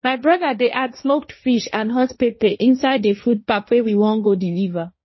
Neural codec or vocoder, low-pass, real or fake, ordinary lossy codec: codec, 16 kHz, 1.1 kbps, Voila-Tokenizer; 7.2 kHz; fake; MP3, 24 kbps